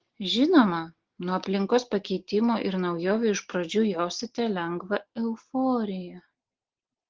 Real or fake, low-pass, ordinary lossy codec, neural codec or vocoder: real; 7.2 kHz; Opus, 16 kbps; none